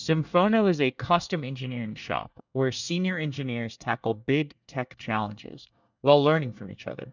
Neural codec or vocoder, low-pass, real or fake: codec, 24 kHz, 1 kbps, SNAC; 7.2 kHz; fake